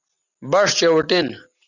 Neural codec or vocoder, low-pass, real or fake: none; 7.2 kHz; real